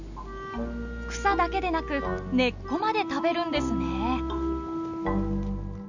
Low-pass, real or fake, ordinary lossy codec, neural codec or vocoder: 7.2 kHz; real; none; none